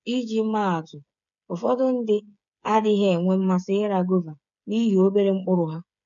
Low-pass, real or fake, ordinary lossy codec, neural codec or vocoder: 7.2 kHz; fake; none; codec, 16 kHz, 8 kbps, FreqCodec, smaller model